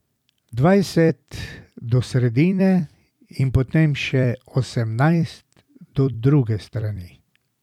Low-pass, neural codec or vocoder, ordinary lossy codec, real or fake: 19.8 kHz; vocoder, 44.1 kHz, 128 mel bands every 256 samples, BigVGAN v2; none; fake